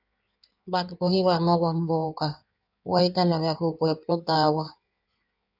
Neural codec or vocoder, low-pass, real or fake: codec, 16 kHz in and 24 kHz out, 1.1 kbps, FireRedTTS-2 codec; 5.4 kHz; fake